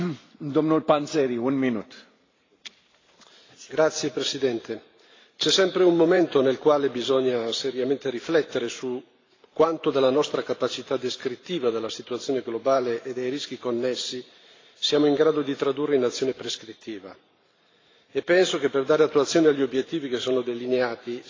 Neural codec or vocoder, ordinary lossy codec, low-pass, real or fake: vocoder, 44.1 kHz, 128 mel bands every 512 samples, BigVGAN v2; AAC, 32 kbps; 7.2 kHz; fake